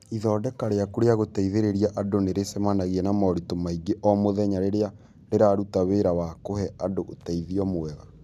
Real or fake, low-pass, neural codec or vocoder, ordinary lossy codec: real; 14.4 kHz; none; none